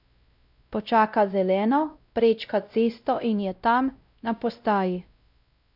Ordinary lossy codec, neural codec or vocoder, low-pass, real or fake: none; codec, 16 kHz, 0.5 kbps, X-Codec, WavLM features, trained on Multilingual LibriSpeech; 5.4 kHz; fake